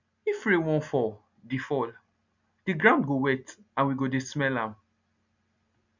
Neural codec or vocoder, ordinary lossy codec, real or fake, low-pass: none; none; real; 7.2 kHz